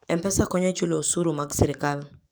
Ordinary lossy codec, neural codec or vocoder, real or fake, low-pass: none; codec, 44.1 kHz, 7.8 kbps, DAC; fake; none